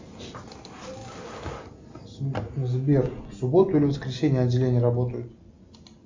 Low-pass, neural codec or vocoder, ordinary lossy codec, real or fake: 7.2 kHz; none; MP3, 64 kbps; real